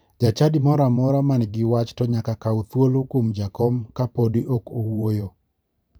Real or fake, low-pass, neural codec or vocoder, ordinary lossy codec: fake; none; vocoder, 44.1 kHz, 128 mel bands every 512 samples, BigVGAN v2; none